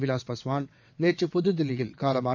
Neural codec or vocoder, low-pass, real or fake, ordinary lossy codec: vocoder, 22.05 kHz, 80 mel bands, WaveNeXt; 7.2 kHz; fake; none